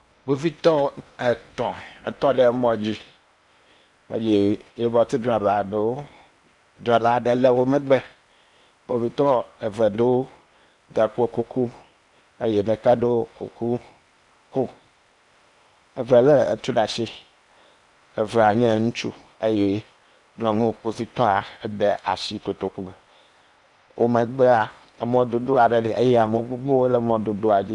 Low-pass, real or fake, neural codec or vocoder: 10.8 kHz; fake; codec, 16 kHz in and 24 kHz out, 0.8 kbps, FocalCodec, streaming, 65536 codes